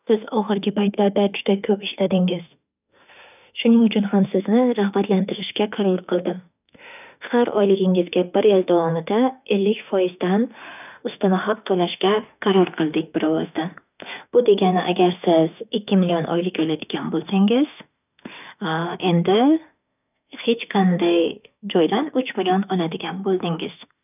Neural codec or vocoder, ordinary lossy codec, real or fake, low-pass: autoencoder, 48 kHz, 32 numbers a frame, DAC-VAE, trained on Japanese speech; none; fake; 3.6 kHz